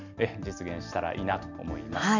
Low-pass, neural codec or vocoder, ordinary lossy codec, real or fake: 7.2 kHz; none; none; real